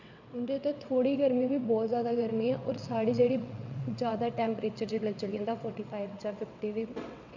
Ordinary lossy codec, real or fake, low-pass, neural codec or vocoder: none; fake; 7.2 kHz; vocoder, 22.05 kHz, 80 mel bands, WaveNeXt